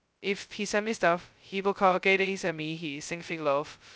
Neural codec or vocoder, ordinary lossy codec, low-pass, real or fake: codec, 16 kHz, 0.2 kbps, FocalCodec; none; none; fake